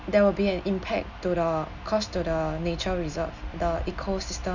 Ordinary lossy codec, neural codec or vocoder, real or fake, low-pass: none; none; real; 7.2 kHz